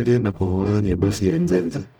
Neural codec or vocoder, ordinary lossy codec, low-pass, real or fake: codec, 44.1 kHz, 0.9 kbps, DAC; none; none; fake